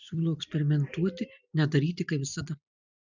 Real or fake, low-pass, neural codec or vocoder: fake; 7.2 kHz; vocoder, 44.1 kHz, 128 mel bands every 256 samples, BigVGAN v2